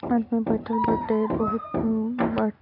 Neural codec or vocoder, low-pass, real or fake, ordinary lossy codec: none; 5.4 kHz; real; none